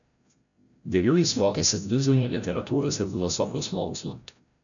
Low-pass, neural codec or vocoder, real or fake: 7.2 kHz; codec, 16 kHz, 0.5 kbps, FreqCodec, larger model; fake